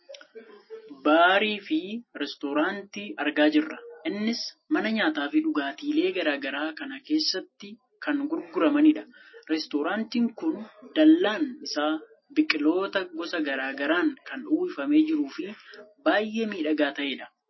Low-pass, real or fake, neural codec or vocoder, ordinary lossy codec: 7.2 kHz; real; none; MP3, 24 kbps